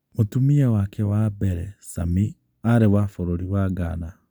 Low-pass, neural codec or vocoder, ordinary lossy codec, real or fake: none; none; none; real